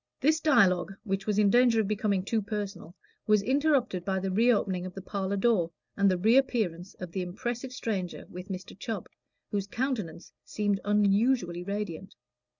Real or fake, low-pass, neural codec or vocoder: real; 7.2 kHz; none